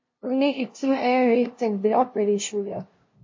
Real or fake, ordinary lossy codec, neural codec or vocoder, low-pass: fake; MP3, 32 kbps; codec, 16 kHz, 0.5 kbps, FunCodec, trained on LibriTTS, 25 frames a second; 7.2 kHz